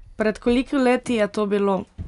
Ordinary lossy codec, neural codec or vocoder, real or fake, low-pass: none; none; real; 10.8 kHz